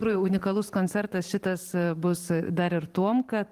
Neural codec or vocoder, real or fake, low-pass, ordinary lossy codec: vocoder, 44.1 kHz, 128 mel bands every 512 samples, BigVGAN v2; fake; 14.4 kHz; Opus, 24 kbps